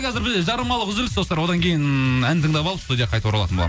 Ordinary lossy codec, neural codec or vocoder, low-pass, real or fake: none; none; none; real